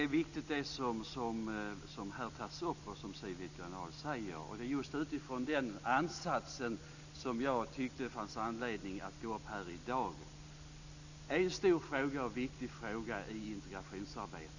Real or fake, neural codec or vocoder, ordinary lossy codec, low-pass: real; none; none; 7.2 kHz